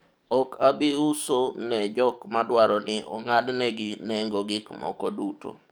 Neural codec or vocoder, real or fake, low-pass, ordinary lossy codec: codec, 44.1 kHz, 7.8 kbps, DAC; fake; none; none